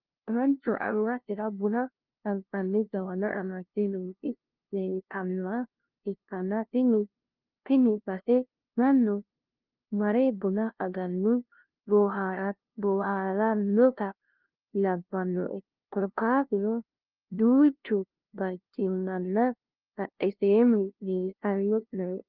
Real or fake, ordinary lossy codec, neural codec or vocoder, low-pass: fake; Opus, 32 kbps; codec, 16 kHz, 0.5 kbps, FunCodec, trained on LibriTTS, 25 frames a second; 5.4 kHz